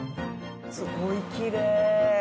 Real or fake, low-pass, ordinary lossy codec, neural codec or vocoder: real; none; none; none